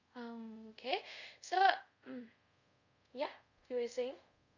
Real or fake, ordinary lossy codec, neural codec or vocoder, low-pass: fake; none; codec, 24 kHz, 0.5 kbps, DualCodec; 7.2 kHz